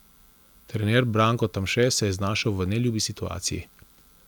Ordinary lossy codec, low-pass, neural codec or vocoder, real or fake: none; none; none; real